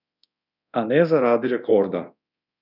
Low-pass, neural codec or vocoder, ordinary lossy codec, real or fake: 5.4 kHz; codec, 24 kHz, 0.9 kbps, DualCodec; none; fake